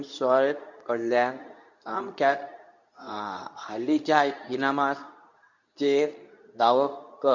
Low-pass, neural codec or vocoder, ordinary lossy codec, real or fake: 7.2 kHz; codec, 24 kHz, 0.9 kbps, WavTokenizer, medium speech release version 2; none; fake